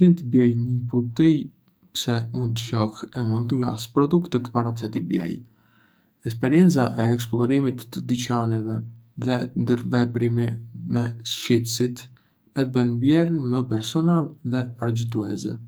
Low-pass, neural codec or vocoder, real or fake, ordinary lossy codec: none; codec, 44.1 kHz, 2.6 kbps, SNAC; fake; none